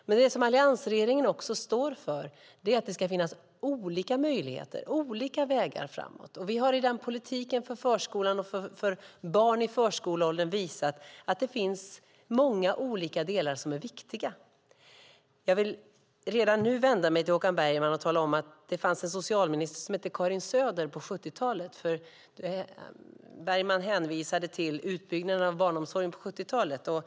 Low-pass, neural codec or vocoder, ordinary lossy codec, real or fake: none; none; none; real